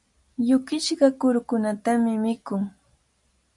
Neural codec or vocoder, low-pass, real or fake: none; 10.8 kHz; real